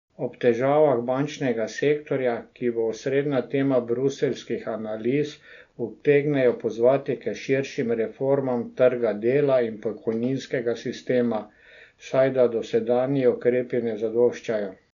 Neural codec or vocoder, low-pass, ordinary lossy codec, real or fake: none; 7.2 kHz; none; real